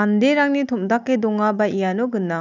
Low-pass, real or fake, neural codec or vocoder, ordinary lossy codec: 7.2 kHz; real; none; none